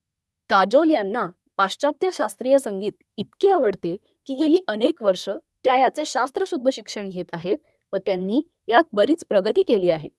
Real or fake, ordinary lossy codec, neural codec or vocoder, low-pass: fake; none; codec, 24 kHz, 1 kbps, SNAC; none